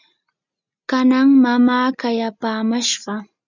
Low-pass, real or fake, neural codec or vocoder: 7.2 kHz; real; none